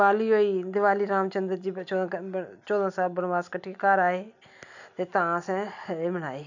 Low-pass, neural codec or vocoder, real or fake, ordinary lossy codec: 7.2 kHz; none; real; none